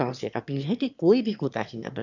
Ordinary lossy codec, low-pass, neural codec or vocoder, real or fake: none; 7.2 kHz; autoencoder, 22.05 kHz, a latent of 192 numbers a frame, VITS, trained on one speaker; fake